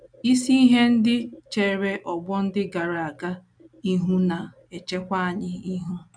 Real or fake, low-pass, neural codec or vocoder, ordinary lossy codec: real; 9.9 kHz; none; MP3, 96 kbps